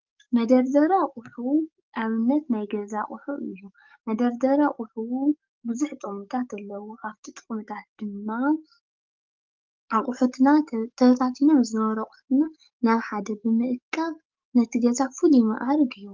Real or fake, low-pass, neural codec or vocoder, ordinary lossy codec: fake; 7.2 kHz; codec, 44.1 kHz, 7.8 kbps, DAC; Opus, 32 kbps